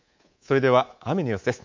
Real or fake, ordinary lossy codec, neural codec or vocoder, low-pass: fake; MP3, 64 kbps; codec, 24 kHz, 3.1 kbps, DualCodec; 7.2 kHz